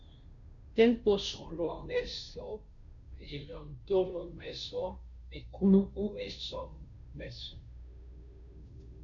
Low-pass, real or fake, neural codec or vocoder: 7.2 kHz; fake; codec, 16 kHz, 0.5 kbps, FunCodec, trained on Chinese and English, 25 frames a second